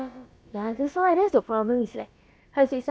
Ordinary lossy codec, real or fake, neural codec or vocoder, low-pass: none; fake; codec, 16 kHz, about 1 kbps, DyCAST, with the encoder's durations; none